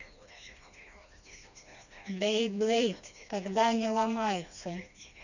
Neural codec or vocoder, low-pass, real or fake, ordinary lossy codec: codec, 16 kHz, 2 kbps, FreqCodec, smaller model; 7.2 kHz; fake; none